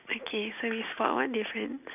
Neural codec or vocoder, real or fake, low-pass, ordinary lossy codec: none; real; 3.6 kHz; none